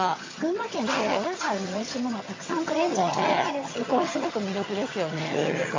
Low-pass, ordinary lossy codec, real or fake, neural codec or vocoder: 7.2 kHz; AAC, 32 kbps; fake; vocoder, 22.05 kHz, 80 mel bands, HiFi-GAN